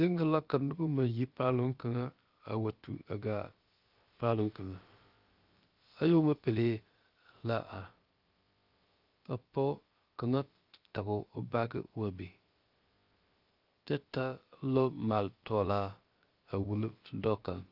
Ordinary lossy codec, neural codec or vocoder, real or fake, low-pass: Opus, 32 kbps; codec, 16 kHz, about 1 kbps, DyCAST, with the encoder's durations; fake; 5.4 kHz